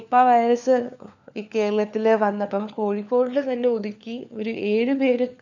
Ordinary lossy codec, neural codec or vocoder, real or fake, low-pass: none; codec, 16 kHz, 4 kbps, FunCodec, trained on LibriTTS, 50 frames a second; fake; 7.2 kHz